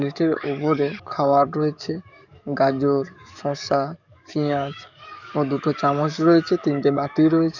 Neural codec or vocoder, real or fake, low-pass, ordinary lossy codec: codec, 44.1 kHz, 7.8 kbps, DAC; fake; 7.2 kHz; none